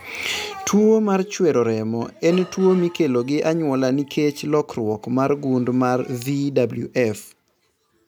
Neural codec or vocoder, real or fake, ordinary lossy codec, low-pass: none; real; none; none